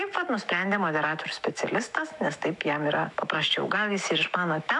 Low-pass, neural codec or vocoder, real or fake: 10.8 kHz; autoencoder, 48 kHz, 128 numbers a frame, DAC-VAE, trained on Japanese speech; fake